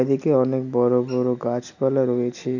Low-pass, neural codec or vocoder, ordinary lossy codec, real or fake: 7.2 kHz; none; none; real